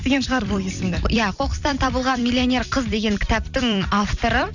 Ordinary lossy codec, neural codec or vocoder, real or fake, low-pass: none; vocoder, 44.1 kHz, 128 mel bands every 256 samples, BigVGAN v2; fake; 7.2 kHz